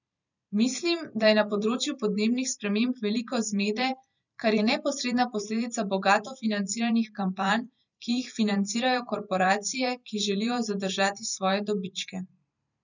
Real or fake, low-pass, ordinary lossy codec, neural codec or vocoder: fake; 7.2 kHz; none; vocoder, 44.1 kHz, 128 mel bands every 512 samples, BigVGAN v2